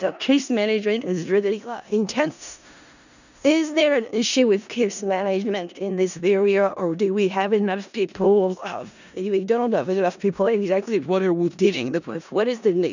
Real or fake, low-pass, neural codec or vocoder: fake; 7.2 kHz; codec, 16 kHz in and 24 kHz out, 0.4 kbps, LongCat-Audio-Codec, four codebook decoder